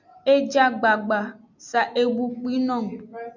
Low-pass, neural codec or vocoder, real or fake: 7.2 kHz; none; real